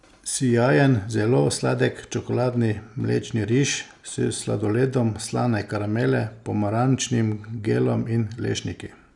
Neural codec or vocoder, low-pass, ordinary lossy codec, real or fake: none; 10.8 kHz; none; real